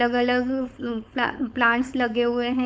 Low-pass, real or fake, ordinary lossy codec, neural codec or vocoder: none; fake; none; codec, 16 kHz, 4.8 kbps, FACodec